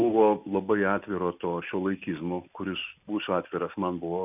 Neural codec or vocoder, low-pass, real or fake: none; 3.6 kHz; real